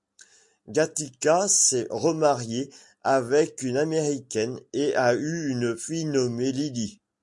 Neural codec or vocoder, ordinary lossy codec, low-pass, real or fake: none; MP3, 64 kbps; 10.8 kHz; real